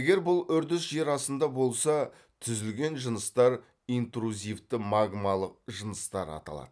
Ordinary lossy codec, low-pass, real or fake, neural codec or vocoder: none; none; real; none